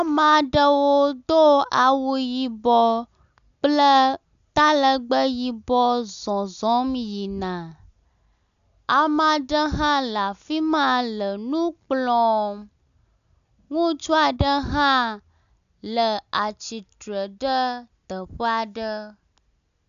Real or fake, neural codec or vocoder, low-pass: real; none; 7.2 kHz